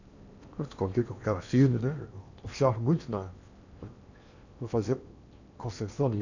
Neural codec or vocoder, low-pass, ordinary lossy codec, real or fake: codec, 16 kHz in and 24 kHz out, 0.8 kbps, FocalCodec, streaming, 65536 codes; 7.2 kHz; none; fake